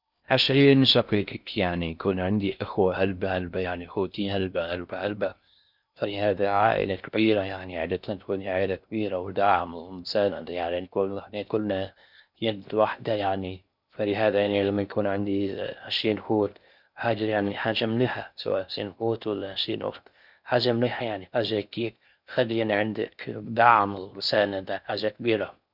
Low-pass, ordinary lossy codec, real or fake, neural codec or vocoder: 5.4 kHz; none; fake; codec, 16 kHz in and 24 kHz out, 0.6 kbps, FocalCodec, streaming, 4096 codes